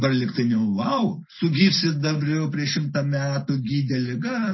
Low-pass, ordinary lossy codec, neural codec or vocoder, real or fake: 7.2 kHz; MP3, 24 kbps; none; real